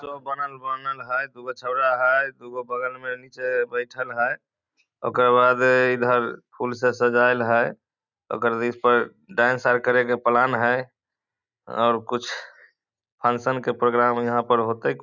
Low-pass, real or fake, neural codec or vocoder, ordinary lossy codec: 7.2 kHz; real; none; none